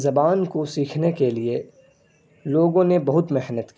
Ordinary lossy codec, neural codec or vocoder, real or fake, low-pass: none; none; real; none